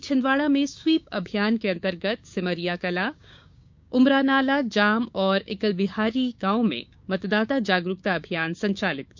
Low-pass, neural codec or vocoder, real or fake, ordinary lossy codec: 7.2 kHz; codec, 16 kHz, 6 kbps, DAC; fake; MP3, 64 kbps